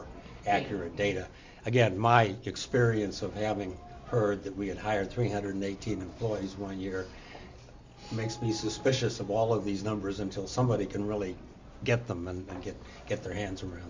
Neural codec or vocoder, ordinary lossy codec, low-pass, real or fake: none; MP3, 64 kbps; 7.2 kHz; real